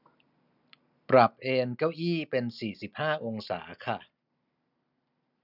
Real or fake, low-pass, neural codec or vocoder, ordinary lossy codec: real; 5.4 kHz; none; none